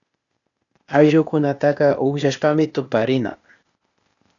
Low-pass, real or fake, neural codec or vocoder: 7.2 kHz; fake; codec, 16 kHz, 0.8 kbps, ZipCodec